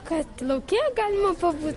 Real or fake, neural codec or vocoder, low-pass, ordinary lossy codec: real; none; 14.4 kHz; MP3, 48 kbps